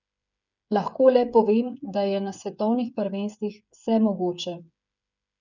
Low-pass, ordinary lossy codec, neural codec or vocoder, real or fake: 7.2 kHz; none; codec, 16 kHz, 8 kbps, FreqCodec, smaller model; fake